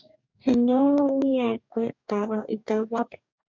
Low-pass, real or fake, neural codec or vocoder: 7.2 kHz; fake; codec, 44.1 kHz, 2.6 kbps, DAC